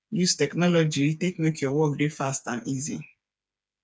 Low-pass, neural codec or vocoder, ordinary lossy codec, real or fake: none; codec, 16 kHz, 4 kbps, FreqCodec, smaller model; none; fake